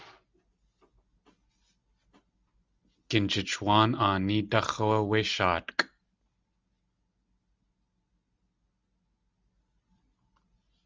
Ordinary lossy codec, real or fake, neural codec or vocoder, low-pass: Opus, 32 kbps; real; none; 7.2 kHz